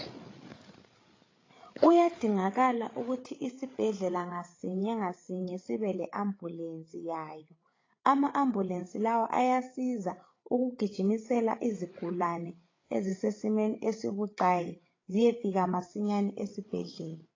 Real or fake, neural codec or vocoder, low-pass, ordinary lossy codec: fake; codec, 16 kHz, 8 kbps, FreqCodec, larger model; 7.2 kHz; AAC, 32 kbps